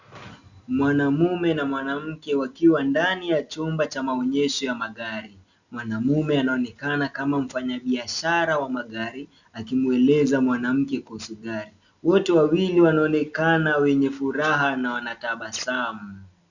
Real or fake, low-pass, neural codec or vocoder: real; 7.2 kHz; none